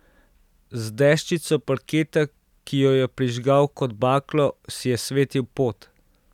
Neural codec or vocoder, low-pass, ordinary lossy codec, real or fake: none; 19.8 kHz; none; real